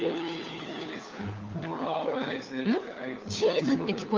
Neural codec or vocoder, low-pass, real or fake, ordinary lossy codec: codec, 16 kHz, 4 kbps, FunCodec, trained on LibriTTS, 50 frames a second; 7.2 kHz; fake; Opus, 32 kbps